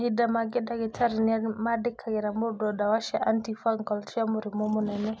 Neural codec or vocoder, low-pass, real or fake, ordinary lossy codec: none; none; real; none